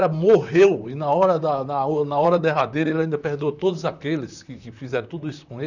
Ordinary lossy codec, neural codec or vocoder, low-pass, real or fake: none; vocoder, 44.1 kHz, 128 mel bands, Pupu-Vocoder; 7.2 kHz; fake